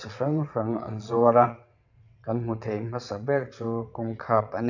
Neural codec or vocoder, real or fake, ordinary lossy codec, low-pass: vocoder, 22.05 kHz, 80 mel bands, WaveNeXt; fake; none; 7.2 kHz